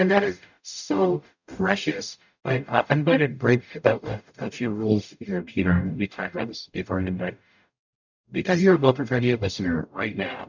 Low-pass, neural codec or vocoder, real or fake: 7.2 kHz; codec, 44.1 kHz, 0.9 kbps, DAC; fake